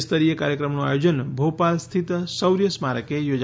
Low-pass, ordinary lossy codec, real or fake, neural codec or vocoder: none; none; real; none